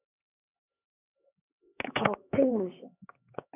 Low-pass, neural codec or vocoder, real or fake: 3.6 kHz; codec, 16 kHz, 2 kbps, X-Codec, HuBERT features, trained on LibriSpeech; fake